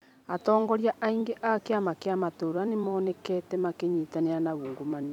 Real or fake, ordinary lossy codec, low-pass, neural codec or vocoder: fake; none; 19.8 kHz; vocoder, 44.1 kHz, 128 mel bands every 256 samples, BigVGAN v2